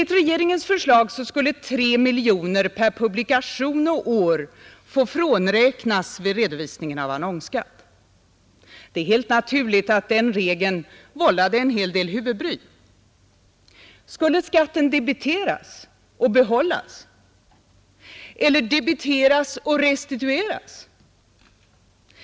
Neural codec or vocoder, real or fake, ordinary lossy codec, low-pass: none; real; none; none